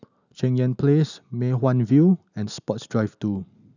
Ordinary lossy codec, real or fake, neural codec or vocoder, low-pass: none; real; none; 7.2 kHz